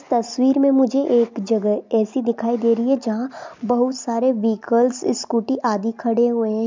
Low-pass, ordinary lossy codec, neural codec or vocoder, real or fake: 7.2 kHz; MP3, 64 kbps; none; real